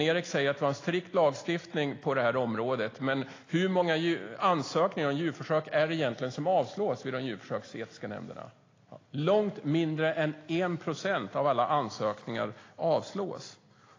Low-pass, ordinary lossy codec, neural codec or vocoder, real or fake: 7.2 kHz; AAC, 32 kbps; none; real